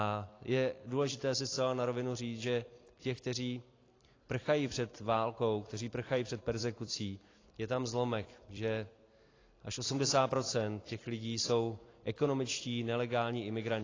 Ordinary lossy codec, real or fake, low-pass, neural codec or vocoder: AAC, 32 kbps; fake; 7.2 kHz; vocoder, 44.1 kHz, 128 mel bands every 256 samples, BigVGAN v2